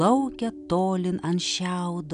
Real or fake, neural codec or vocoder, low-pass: real; none; 9.9 kHz